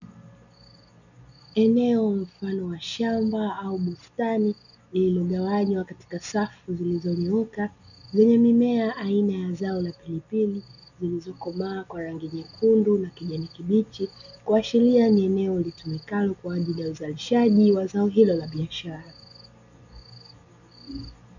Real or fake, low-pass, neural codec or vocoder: real; 7.2 kHz; none